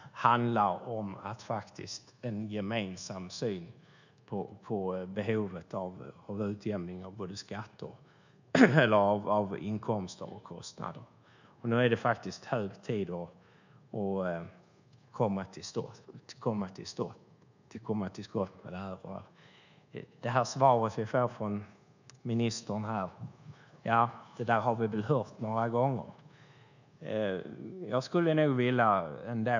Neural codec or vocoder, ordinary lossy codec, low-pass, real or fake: codec, 24 kHz, 1.2 kbps, DualCodec; none; 7.2 kHz; fake